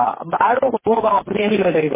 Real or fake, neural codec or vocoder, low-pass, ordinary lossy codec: fake; codec, 16 kHz, 4 kbps, FreqCodec, smaller model; 3.6 kHz; MP3, 16 kbps